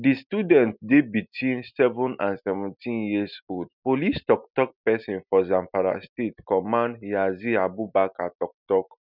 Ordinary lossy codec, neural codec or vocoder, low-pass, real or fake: none; none; 5.4 kHz; real